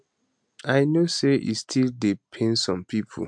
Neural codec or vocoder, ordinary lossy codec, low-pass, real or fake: none; MP3, 64 kbps; 9.9 kHz; real